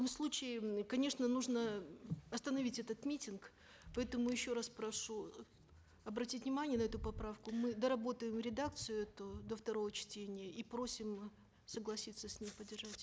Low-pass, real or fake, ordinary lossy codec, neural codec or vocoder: none; real; none; none